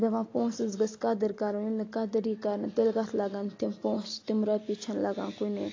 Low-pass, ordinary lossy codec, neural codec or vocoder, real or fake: 7.2 kHz; AAC, 32 kbps; none; real